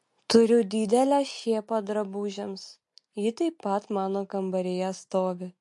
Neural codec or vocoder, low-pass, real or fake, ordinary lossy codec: none; 10.8 kHz; real; MP3, 48 kbps